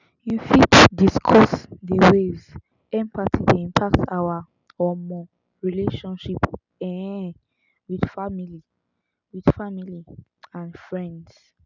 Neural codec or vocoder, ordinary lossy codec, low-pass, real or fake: none; none; 7.2 kHz; real